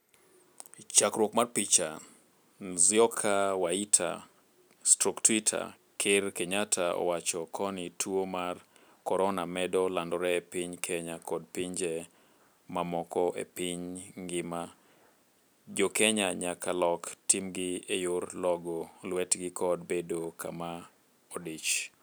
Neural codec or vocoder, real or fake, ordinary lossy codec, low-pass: none; real; none; none